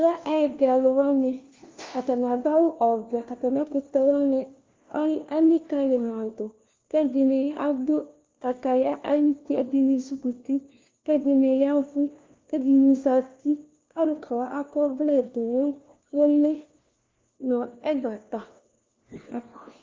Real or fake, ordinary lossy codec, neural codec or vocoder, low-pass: fake; Opus, 32 kbps; codec, 16 kHz, 1 kbps, FunCodec, trained on LibriTTS, 50 frames a second; 7.2 kHz